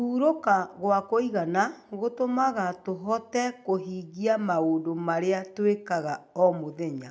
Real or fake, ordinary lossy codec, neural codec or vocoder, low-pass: real; none; none; none